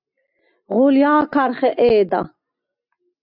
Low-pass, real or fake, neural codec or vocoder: 5.4 kHz; real; none